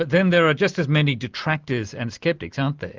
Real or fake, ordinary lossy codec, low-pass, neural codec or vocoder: real; Opus, 32 kbps; 7.2 kHz; none